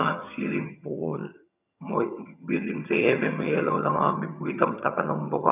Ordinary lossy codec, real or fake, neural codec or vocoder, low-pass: none; fake; vocoder, 22.05 kHz, 80 mel bands, HiFi-GAN; 3.6 kHz